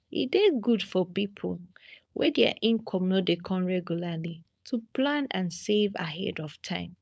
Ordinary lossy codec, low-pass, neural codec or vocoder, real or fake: none; none; codec, 16 kHz, 4.8 kbps, FACodec; fake